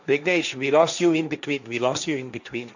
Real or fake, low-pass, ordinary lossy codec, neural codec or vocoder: fake; 7.2 kHz; none; codec, 16 kHz, 1.1 kbps, Voila-Tokenizer